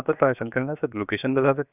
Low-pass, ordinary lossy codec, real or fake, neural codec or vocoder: 3.6 kHz; none; fake; codec, 16 kHz, 0.7 kbps, FocalCodec